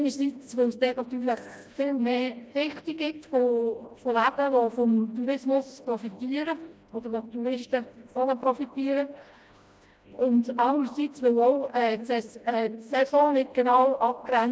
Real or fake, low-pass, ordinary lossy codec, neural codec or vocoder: fake; none; none; codec, 16 kHz, 1 kbps, FreqCodec, smaller model